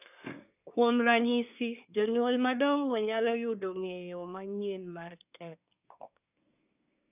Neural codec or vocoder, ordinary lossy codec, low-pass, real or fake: codec, 24 kHz, 1 kbps, SNAC; none; 3.6 kHz; fake